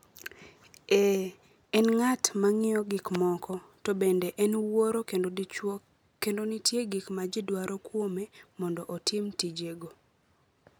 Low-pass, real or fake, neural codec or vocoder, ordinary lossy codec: none; real; none; none